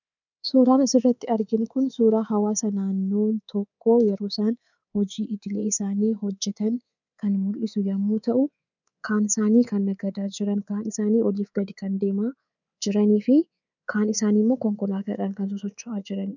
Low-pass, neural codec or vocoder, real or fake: 7.2 kHz; codec, 24 kHz, 3.1 kbps, DualCodec; fake